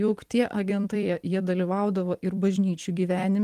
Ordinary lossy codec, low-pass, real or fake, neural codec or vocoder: Opus, 24 kbps; 14.4 kHz; fake; vocoder, 44.1 kHz, 128 mel bands every 256 samples, BigVGAN v2